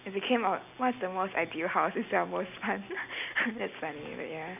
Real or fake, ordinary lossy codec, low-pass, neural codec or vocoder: real; none; 3.6 kHz; none